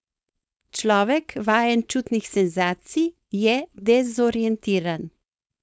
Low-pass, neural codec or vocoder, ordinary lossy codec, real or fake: none; codec, 16 kHz, 4.8 kbps, FACodec; none; fake